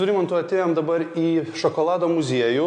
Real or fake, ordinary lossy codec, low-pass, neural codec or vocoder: real; AAC, 64 kbps; 9.9 kHz; none